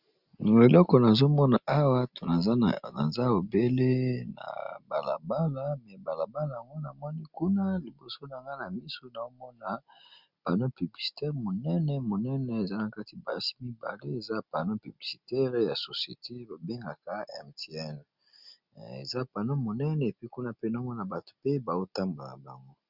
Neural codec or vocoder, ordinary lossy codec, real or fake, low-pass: none; Opus, 64 kbps; real; 5.4 kHz